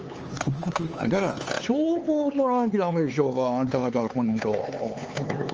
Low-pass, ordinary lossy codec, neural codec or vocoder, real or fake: 7.2 kHz; Opus, 24 kbps; codec, 16 kHz, 4 kbps, X-Codec, HuBERT features, trained on LibriSpeech; fake